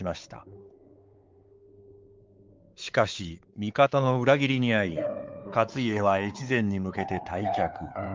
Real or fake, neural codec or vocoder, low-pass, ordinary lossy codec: fake; codec, 16 kHz, 4 kbps, X-Codec, WavLM features, trained on Multilingual LibriSpeech; 7.2 kHz; Opus, 24 kbps